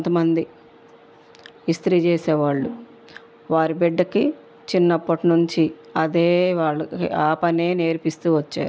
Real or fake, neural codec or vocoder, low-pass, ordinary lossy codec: real; none; none; none